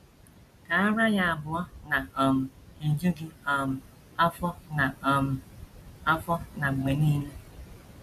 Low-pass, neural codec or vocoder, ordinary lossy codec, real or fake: 14.4 kHz; none; none; real